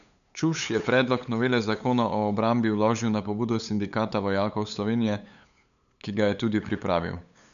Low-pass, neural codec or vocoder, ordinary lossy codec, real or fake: 7.2 kHz; codec, 16 kHz, 8 kbps, FunCodec, trained on LibriTTS, 25 frames a second; none; fake